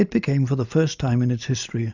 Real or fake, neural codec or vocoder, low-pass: real; none; 7.2 kHz